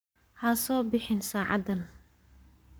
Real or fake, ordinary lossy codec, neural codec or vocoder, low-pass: fake; none; codec, 44.1 kHz, 7.8 kbps, Pupu-Codec; none